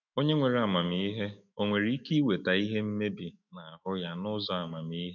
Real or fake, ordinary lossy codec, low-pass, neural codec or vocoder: fake; none; 7.2 kHz; autoencoder, 48 kHz, 128 numbers a frame, DAC-VAE, trained on Japanese speech